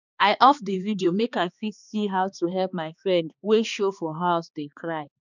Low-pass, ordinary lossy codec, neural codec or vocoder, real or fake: 7.2 kHz; none; codec, 16 kHz, 2 kbps, X-Codec, HuBERT features, trained on balanced general audio; fake